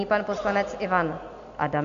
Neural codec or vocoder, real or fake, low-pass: none; real; 7.2 kHz